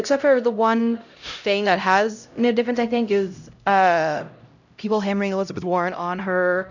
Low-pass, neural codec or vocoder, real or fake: 7.2 kHz; codec, 16 kHz, 0.5 kbps, X-Codec, HuBERT features, trained on LibriSpeech; fake